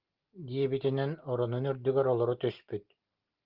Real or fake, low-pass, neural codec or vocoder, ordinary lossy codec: real; 5.4 kHz; none; Opus, 32 kbps